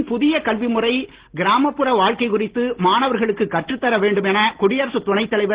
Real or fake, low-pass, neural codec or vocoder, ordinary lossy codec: real; 3.6 kHz; none; Opus, 16 kbps